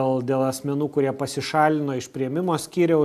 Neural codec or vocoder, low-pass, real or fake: none; 14.4 kHz; real